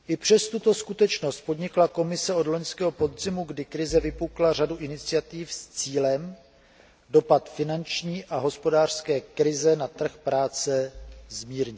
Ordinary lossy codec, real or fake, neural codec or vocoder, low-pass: none; real; none; none